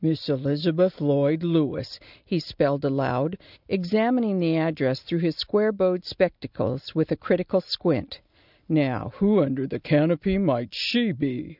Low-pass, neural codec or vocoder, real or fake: 5.4 kHz; none; real